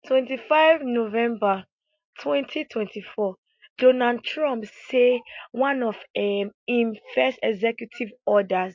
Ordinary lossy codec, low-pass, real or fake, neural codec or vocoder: MP3, 48 kbps; 7.2 kHz; real; none